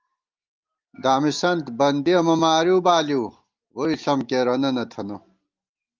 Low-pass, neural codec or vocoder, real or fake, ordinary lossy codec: 7.2 kHz; none; real; Opus, 32 kbps